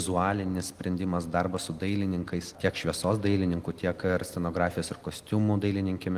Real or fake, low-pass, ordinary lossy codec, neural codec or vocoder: real; 14.4 kHz; Opus, 24 kbps; none